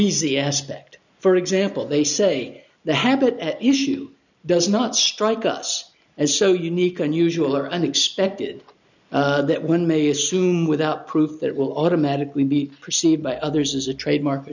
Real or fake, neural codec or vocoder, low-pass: real; none; 7.2 kHz